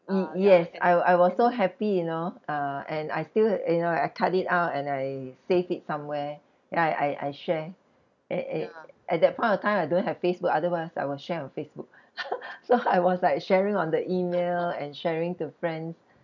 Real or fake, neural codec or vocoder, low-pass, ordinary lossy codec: real; none; 7.2 kHz; none